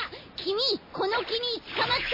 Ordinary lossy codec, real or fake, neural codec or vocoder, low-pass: MP3, 24 kbps; real; none; 5.4 kHz